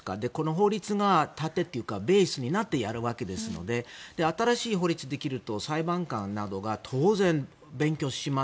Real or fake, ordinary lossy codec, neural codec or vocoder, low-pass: real; none; none; none